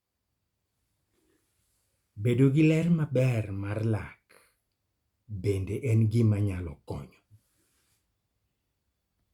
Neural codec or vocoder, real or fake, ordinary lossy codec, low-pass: none; real; Opus, 64 kbps; 19.8 kHz